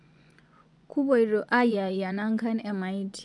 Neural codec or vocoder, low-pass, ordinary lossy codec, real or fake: vocoder, 24 kHz, 100 mel bands, Vocos; 10.8 kHz; none; fake